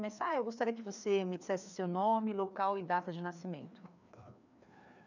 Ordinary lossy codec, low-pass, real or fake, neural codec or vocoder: none; 7.2 kHz; fake; codec, 16 kHz, 2 kbps, FreqCodec, larger model